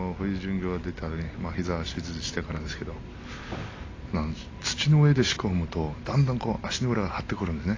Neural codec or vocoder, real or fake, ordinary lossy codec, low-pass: none; real; AAC, 32 kbps; 7.2 kHz